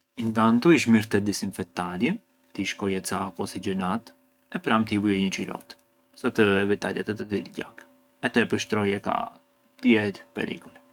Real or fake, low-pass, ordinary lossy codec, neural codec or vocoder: fake; 19.8 kHz; none; codec, 44.1 kHz, 7.8 kbps, DAC